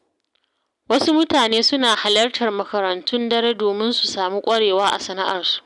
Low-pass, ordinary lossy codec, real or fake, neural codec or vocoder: 10.8 kHz; none; real; none